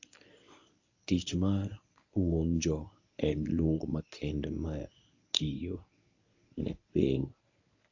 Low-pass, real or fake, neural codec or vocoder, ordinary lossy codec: 7.2 kHz; fake; codec, 24 kHz, 0.9 kbps, WavTokenizer, medium speech release version 1; AAC, 48 kbps